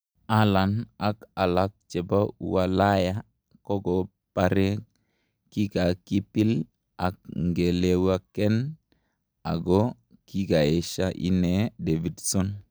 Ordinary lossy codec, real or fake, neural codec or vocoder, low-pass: none; real; none; none